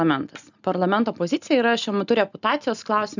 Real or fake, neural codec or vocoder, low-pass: real; none; 7.2 kHz